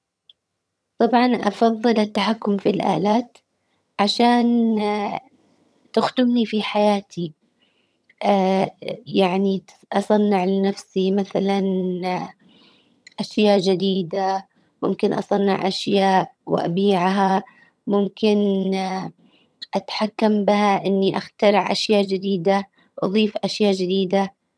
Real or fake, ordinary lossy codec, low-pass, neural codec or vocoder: fake; none; none; vocoder, 22.05 kHz, 80 mel bands, HiFi-GAN